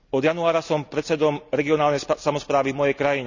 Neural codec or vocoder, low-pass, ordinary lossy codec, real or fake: none; 7.2 kHz; none; real